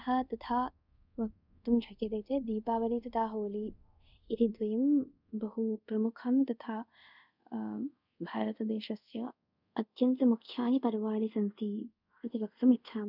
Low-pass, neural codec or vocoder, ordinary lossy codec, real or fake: 5.4 kHz; codec, 24 kHz, 0.5 kbps, DualCodec; none; fake